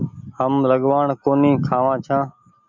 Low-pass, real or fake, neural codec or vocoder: 7.2 kHz; real; none